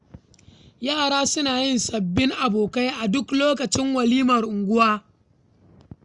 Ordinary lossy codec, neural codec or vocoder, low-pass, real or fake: Opus, 64 kbps; none; 10.8 kHz; real